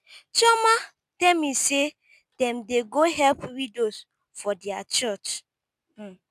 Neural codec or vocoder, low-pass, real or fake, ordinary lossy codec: none; 14.4 kHz; real; none